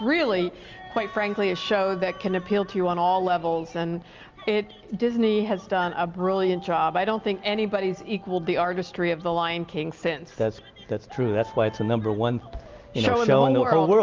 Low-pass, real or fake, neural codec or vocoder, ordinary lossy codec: 7.2 kHz; real; none; Opus, 32 kbps